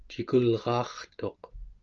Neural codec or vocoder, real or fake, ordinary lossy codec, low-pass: none; real; Opus, 24 kbps; 7.2 kHz